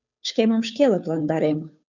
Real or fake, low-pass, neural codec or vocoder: fake; 7.2 kHz; codec, 16 kHz, 2 kbps, FunCodec, trained on Chinese and English, 25 frames a second